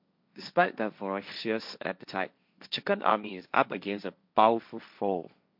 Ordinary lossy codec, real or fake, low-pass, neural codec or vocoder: MP3, 48 kbps; fake; 5.4 kHz; codec, 16 kHz, 1.1 kbps, Voila-Tokenizer